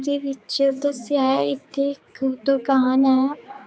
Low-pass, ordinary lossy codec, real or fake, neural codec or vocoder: none; none; fake; codec, 16 kHz, 4 kbps, X-Codec, HuBERT features, trained on general audio